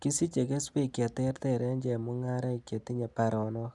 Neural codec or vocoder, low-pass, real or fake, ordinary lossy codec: none; 10.8 kHz; real; none